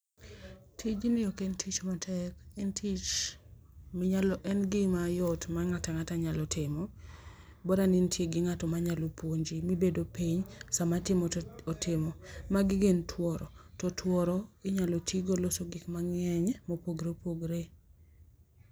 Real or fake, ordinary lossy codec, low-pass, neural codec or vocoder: real; none; none; none